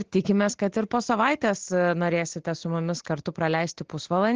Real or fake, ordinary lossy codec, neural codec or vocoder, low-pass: real; Opus, 16 kbps; none; 7.2 kHz